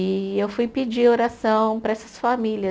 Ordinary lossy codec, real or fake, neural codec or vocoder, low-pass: none; real; none; none